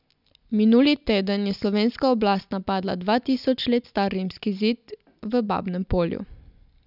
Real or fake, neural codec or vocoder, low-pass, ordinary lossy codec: real; none; 5.4 kHz; none